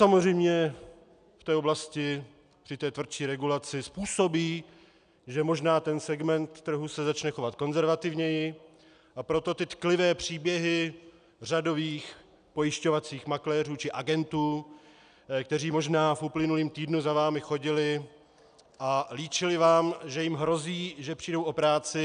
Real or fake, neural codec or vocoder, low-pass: real; none; 9.9 kHz